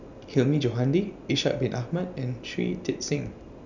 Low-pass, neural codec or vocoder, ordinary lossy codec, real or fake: 7.2 kHz; none; none; real